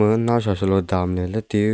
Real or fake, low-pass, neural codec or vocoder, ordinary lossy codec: real; none; none; none